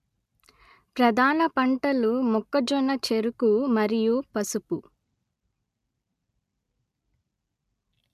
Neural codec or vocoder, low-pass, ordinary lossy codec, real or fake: none; 14.4 kHz; MP3, 96 kbps; real